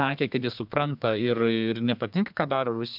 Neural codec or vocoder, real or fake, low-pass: codec, 32 kHz, 1.9 kbps, SNAC; fake; 5.4 kHz